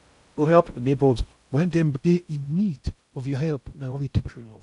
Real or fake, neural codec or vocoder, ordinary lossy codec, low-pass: fake; codec, 16 kHz in and 24 kHz out, 0.6 kbps, FocalCodec, streaming, 2048 codes; none; 10.8 kHz